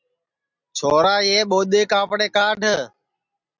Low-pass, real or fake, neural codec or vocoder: 7.2 kHz; real; none